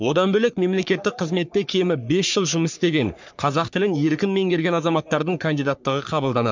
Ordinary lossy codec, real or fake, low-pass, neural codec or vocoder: MP3, 64 kbps; fake; 7.2 kHz; codec, 44.1 kHz, 3.4 kbps, Pupu-Codec